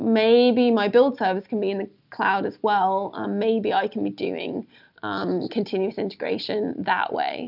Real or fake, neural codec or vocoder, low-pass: real; none; 5.4 kHz